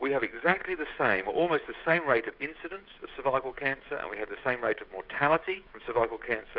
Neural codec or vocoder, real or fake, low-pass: codec, 16 kHz, 16 kbps, FreqCodec, smaller model; fake; 5.4 kHz